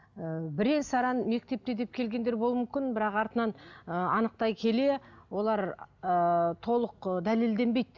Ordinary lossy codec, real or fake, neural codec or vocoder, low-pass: none; real; none; 7.2 kHz